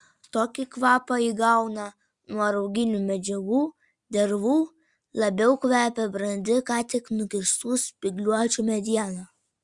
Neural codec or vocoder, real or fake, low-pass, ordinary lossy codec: none; real; 10.8 kHz; Opus, 64 kbps